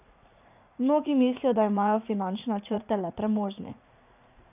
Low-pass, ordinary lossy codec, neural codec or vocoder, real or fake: 3.6 kHz; none; vocoder, 44.1 kHz, 80 mel bands, Vocos; fake